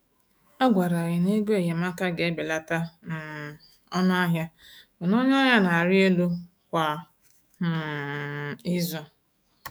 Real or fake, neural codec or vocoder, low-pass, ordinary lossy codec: fake; autoencoder, 48 kHz, 128 numbers a frame, DAC-VAE, trained on Japanese speech; none; none